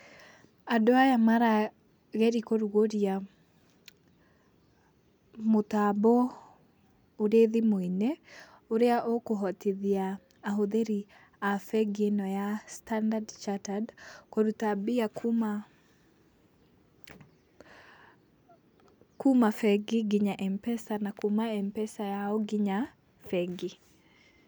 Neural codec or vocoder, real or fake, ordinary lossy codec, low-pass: none; real; none; none